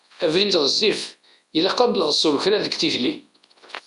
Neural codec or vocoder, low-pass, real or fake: codec, 24 kHz, 0.9 kbps, WavTokenizer, large speech release; 10.8 kHz; fake